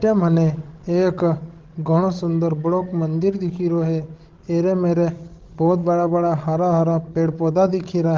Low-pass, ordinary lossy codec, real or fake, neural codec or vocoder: 7.2 kHz; Opus, 16 kbps; fake; codec, 16 kHz, 16 kbps, FreqCodec, larger model